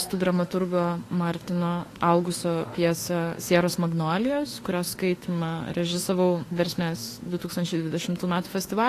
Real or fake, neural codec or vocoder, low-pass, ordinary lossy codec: fake; autoencoder, 48 kHz, 32 numbers a frame, DAC-VAE, trained on Japanese speech; 14.4 kHz; AAC, 48 kbps